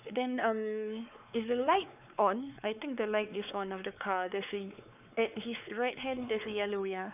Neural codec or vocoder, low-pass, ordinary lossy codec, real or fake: codec, 16 kHz, 4 kbps, X-Codec, WavLM features, trained on Multilingual LibriSpeech; 3.6 kHz; none; fake